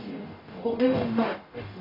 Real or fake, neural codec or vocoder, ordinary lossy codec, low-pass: fake; codec, 44.1 kHz, 0.9 kbps, DAC; AAC, 32 kbps; 5.4 kHz